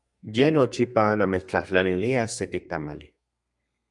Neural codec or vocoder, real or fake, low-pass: codec, 32 kHz, 1.9 kbps, SNAC; fake; 10.8 kHz